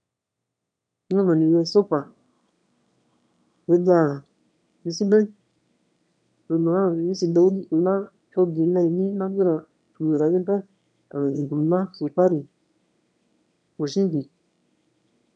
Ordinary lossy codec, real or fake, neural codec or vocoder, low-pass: none; fake; autoencoder, 22.05 kHz, a latent of 192 numbers a frame, VITS, trained on one speaker; 9.9 kHz